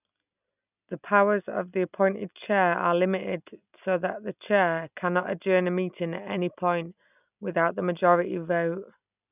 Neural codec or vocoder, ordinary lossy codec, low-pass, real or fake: none; none; 3.6 kHz; real